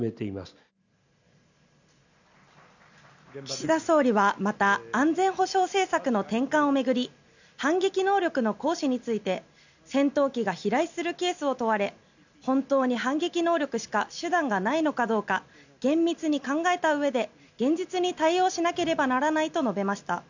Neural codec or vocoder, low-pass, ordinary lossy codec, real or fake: none; 7.2 kHz; none; real